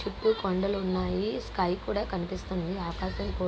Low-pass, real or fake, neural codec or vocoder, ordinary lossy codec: none; real; none; none